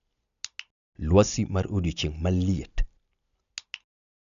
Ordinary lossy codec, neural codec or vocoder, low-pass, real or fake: none; none; 7.2 kHz; real